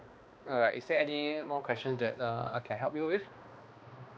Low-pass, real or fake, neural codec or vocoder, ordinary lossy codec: none; fake; codec, 16 kHz, 2 kbps, X-Codec, HuBERT features, trained on balanced general audio; none